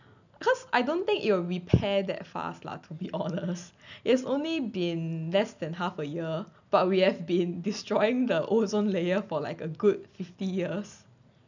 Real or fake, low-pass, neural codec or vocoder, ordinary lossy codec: real; 7.2 kHz; none; none